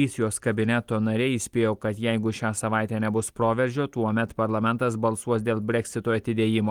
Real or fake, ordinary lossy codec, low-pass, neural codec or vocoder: real; Opus, 24 kbps; 19.8 kHz; none